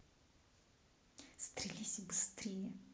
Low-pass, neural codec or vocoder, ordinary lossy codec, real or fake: none; none; none; real